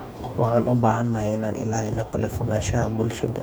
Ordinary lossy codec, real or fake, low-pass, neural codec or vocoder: none; fake; none; codec, 44.1 kHz, 2.6 kbps, DAC